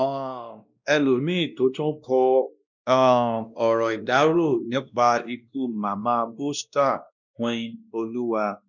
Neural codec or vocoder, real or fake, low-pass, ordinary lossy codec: codec, 16 kHz, 1 kbps, X-Codec, WavLM features, trained on Multilingual LibriSpeech; fake; 7.2 kHz; none